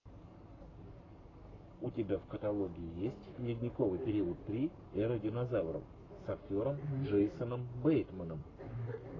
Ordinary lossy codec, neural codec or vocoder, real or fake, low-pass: AAC, 32 kbps; codec, 44.1 kHz, 7.8 kbps, Pupu-Codec; fake; 7.2 kHz